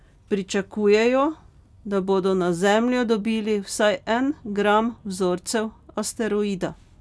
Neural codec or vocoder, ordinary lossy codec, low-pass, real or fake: none; none; none; real